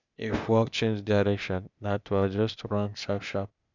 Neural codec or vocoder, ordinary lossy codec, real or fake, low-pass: codec, 16 kHz, 0.8 kbps, ZipCodec; none; fake; 7.2 kHz